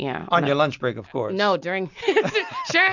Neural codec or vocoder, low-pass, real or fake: none; 7.2 kHz; real